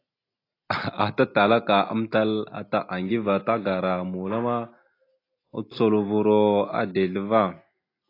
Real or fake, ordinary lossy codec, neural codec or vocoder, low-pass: real; AAC, 32 kbps; none; 5.4 kHz